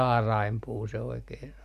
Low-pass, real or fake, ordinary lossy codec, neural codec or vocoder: 14.4 kHz; real; none; none